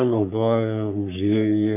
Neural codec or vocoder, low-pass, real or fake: codec, 44.1 kHz, 3.4 kbps, Pupu-Codec; 3.6 kHz; fake